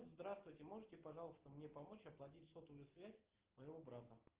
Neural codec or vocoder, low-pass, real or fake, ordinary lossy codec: none; 3.6 kHz; real; Opus, 16 kbps